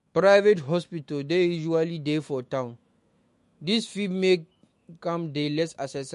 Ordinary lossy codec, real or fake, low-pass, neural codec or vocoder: MP3, 48 kbps; fake; 14.4 kHz; autoencoder, 48 kHz, 128 numbers a frame, DAC-VAE, trained on Japanese speech